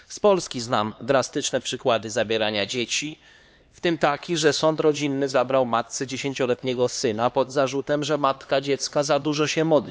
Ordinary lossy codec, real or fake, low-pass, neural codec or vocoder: none; fake; none; codec, 16 kHz, 2 kbps, X-Codec, HuBERT features, trained on LibriSpeech